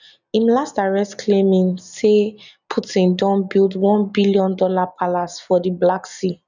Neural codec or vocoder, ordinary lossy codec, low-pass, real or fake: none; none; 7.2 kHz; real